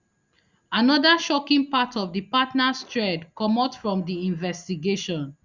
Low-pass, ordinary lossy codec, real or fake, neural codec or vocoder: 7.2 kHz; Opus, 64 kbps; real; none